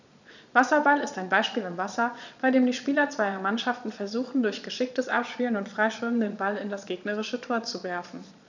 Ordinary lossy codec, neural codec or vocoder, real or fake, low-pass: none; vocoder, 22.05 kHz, 80 mel bands, Vocos; fake; 7.2 kHz